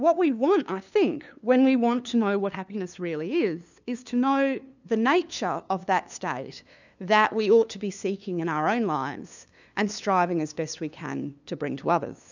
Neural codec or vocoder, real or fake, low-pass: codec, 16 kHz, 2 kbps, FunCodec, trained on LibriTTS, 25 frames a second; fake; 7.2 kHz